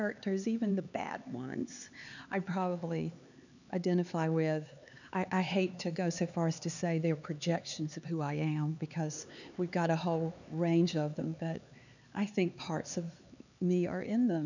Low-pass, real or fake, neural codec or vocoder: 7.2 kHz; fake; codec, 16 kHz, 4 kbps, X-Codec, HuBERT features, trained on LibriSpeech